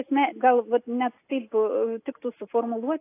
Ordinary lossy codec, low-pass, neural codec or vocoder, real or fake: AAC, 24 kbps; 3.6 kHz; none; real